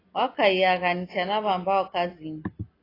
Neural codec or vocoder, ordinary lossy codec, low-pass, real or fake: none; AAC, 24 kbps; 5.4 kHz; real